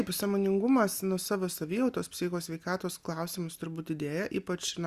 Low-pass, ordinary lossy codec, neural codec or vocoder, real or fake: 14.4 kHz; Opus, 64 kbps; none; real